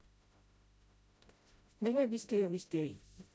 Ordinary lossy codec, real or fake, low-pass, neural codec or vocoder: none; fake; none; codec, 16 kHz, 0.5 kbps, FreqCodec, smaller model